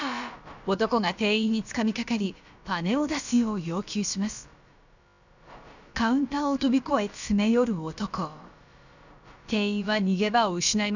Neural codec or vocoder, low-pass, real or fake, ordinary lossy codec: codec, 16 kHz, about 1 kbps, DyCAST, with the encoder's durations; 7.2 kHz; fake; none